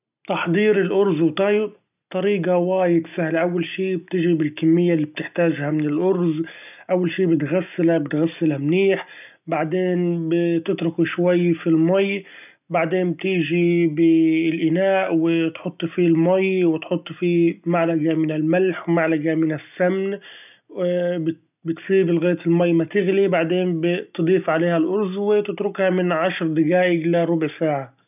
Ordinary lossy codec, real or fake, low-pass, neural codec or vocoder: none; real; 3.6 kHz; none